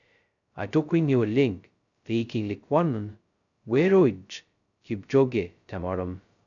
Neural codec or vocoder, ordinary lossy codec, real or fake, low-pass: codec, 16 kHz, 0.2 kbps, FocalCodec; none; fake; 7.2 kHz